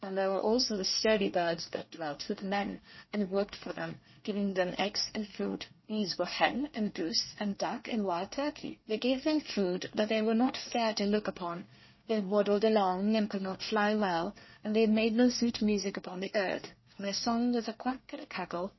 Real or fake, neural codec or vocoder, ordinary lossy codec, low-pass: fake; codec, 24 kHz, 1 kbps, SNAC; MP3, 24 kbps; 7.2 kHz